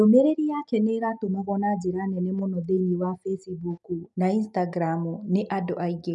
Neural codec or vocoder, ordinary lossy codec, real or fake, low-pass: none; none; real; 10.8 kHz